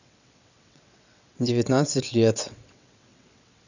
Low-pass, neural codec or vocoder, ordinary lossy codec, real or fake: 7.2 kHz; vocoder, 22.05 kHz, 80 mel bands, WaveNeXt; none; fake